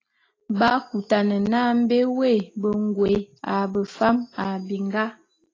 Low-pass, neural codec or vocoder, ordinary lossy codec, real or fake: 7.2 kHz; none; AAC, 32 kbps; real